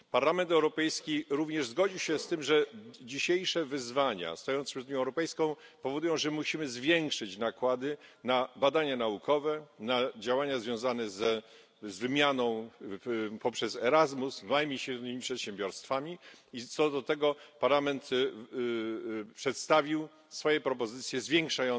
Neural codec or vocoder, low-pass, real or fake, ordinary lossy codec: none; none; real; none